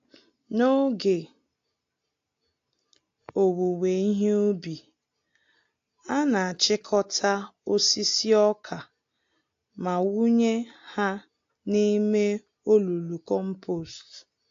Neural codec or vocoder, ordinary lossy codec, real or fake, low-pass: none; AAC, 48 kbps; real; 7.2 kHz